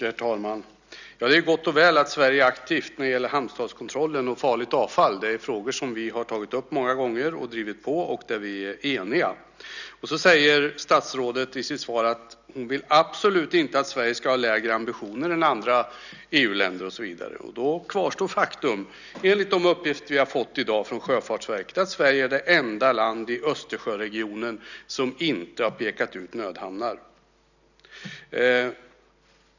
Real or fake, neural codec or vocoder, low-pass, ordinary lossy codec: real; none; 7.2 kHz; none